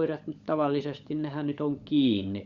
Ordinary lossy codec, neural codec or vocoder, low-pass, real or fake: Opus, 64 kbps; codec, 16 kHz, 16 kbps, FunCodec, trained on LibriTTS, 50 frames a second; 7.2 kHz; fake